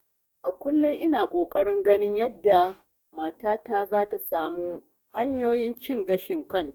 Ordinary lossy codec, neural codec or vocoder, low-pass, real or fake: none; codec, 44.1 kHz, 2.6 kbps, DAC; 19.8 kHz; fake